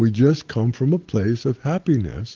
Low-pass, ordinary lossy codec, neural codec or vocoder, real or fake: 7.2 kHz; Opus, 16 kbps; none; real